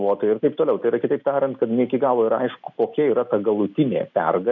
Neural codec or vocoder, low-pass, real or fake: none; 7.2 kHz; real